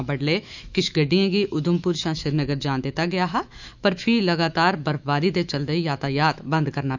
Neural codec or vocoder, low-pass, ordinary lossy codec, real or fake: autoencoder, 48 kHz, 128 numbers a frame, DAC-VAE, trained on Japanese speech; 7.2 kHz; none; fake